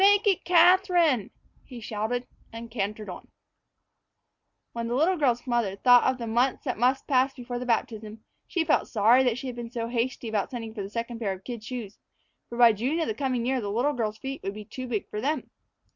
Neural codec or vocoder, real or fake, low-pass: none; real; 7.2 kHz